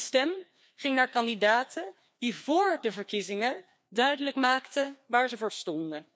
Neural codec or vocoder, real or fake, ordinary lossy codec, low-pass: codec, 16 kHz, 2 kbps, FreqCodec, larger model; fake; none; none